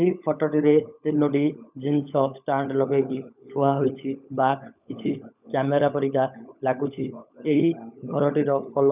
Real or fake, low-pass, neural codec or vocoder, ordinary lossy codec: fake; 3.6 kHz; codec, 16 kHz, 16 kbps, FunCodec, trained on LibriTTS, 50 frames a second; none